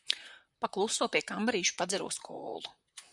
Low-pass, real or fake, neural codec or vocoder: 10.8 kHz; fake; vocoder, 44.1 kHz, 128 mel bands, Pupu-Vocoder